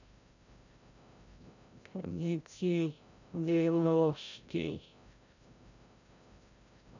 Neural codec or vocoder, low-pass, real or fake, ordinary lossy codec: codec, 16 kHz, 0.5 kbps, FreqCodec, larger model; 7.2 kHz; fake; none